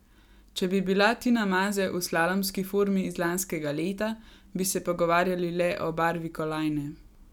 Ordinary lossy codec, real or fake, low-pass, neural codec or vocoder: none; real; 19.8 kHz; none